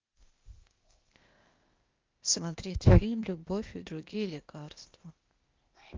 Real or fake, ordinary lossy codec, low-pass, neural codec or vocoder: fake; Opus, 24 kbps; 7.2 kHz; codec, 16 kHz, 0.8 kbps, ZipCodec